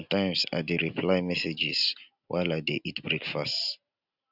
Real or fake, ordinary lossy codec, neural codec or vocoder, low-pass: real; none; none; 5.4 kHz